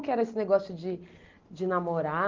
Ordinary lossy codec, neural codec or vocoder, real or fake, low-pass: Opus, 24 kbps; none; real; 7.2 kHz